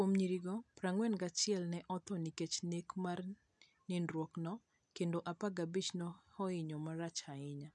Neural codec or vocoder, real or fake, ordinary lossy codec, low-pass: none; real; none; 9.9 kHz